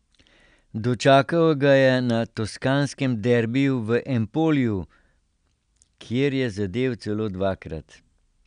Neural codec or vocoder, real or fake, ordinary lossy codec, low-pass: none; real; none; 9.9 kHz